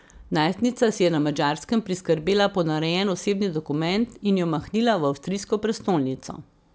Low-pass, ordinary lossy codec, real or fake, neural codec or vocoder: none; none; real; none